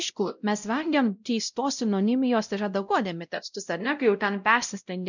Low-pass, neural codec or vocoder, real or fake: 7.2 kHz; codec, 16 kHz, 0.5 kbps, X-Codec, WavLM features, trained on Multilingual LibriSpeech; fake